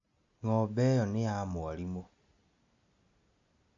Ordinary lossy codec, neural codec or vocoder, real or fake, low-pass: AAC, 64 kbps; none; real; 7.2 kHz